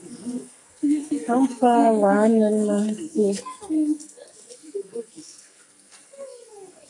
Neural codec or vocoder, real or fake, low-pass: codec, 44.1 kHz, 2.6 kbps, SNAC; fake; 10.8 kHz